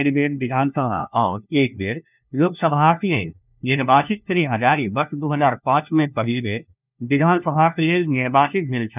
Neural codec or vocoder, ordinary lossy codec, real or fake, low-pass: codec, 16 kHz, 1 kbps, FunCodec, trained on LibriTTS, 50 frames a second; none; fake; 3.6 kHz